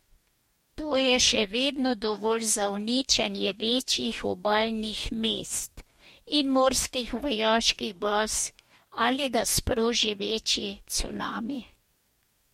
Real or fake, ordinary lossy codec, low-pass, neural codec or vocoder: fake; MP3, 64 kbps; 19.8 kHz; codec, 44.1 kHz, 2.6 kbps, DAC